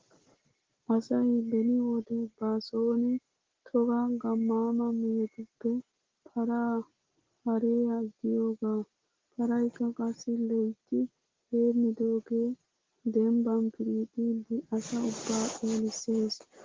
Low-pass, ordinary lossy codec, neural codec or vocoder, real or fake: 7.2 kHz; Opus, 16 kbps; none; real